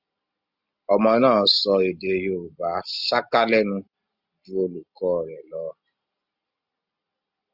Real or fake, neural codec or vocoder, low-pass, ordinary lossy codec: real; none; 5.4 kHz; Opus, 64 kbps